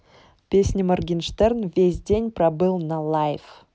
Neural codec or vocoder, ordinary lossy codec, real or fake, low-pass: none; none; real; none